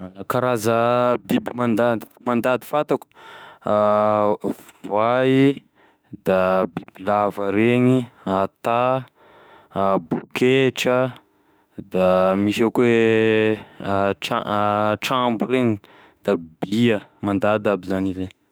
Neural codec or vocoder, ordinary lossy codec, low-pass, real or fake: autoencoder, 48 kHz, 32 numbers a frame, DAC-VAE, trained on Japanese speech; none; none; fake